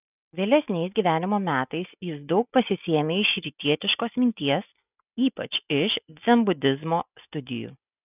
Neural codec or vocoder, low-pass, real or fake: none; 3.6 kHz; real